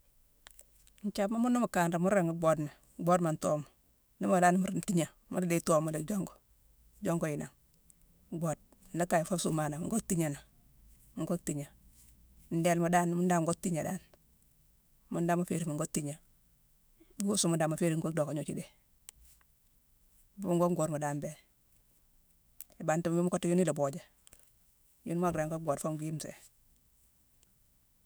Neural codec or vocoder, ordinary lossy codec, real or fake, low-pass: autoencoder, 48 kHz, 128 numbers a frame, DAC-VAE, trained on Japanese speech; none; fake; none